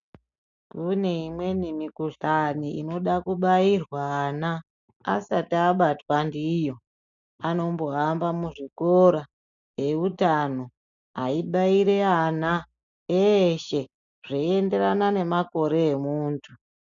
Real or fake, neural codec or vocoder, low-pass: real; none; 7.2 kHz